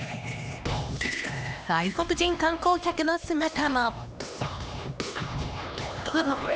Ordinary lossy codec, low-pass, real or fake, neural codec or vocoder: none; none; fake; codec, 16 kHz, 2 kbps, X-Codec, HuBERT features, trained on LibriSpeech